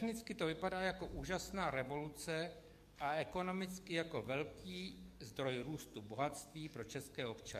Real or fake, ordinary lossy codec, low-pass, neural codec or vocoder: fake; MP3, 64 kbps; 14.4 kHz; codec, 44.1 kHz, 7.8 kbps, DAC